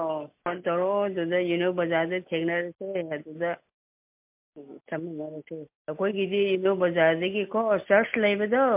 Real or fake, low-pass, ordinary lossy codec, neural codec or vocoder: real; 3.6 kHz; MP3, 32 kbps; none